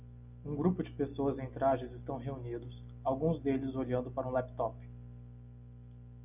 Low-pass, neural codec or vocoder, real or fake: 3.6 kHz; none; real